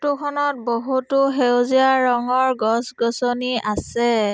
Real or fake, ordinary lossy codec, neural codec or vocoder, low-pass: real; none; none; none